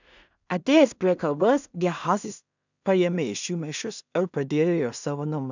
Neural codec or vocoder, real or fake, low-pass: codec, 16 kHz in and 24 kHz out, 0.4 kbps, LongCat-Audio-Codec, two codebook decoder; fake; 7.2 kHz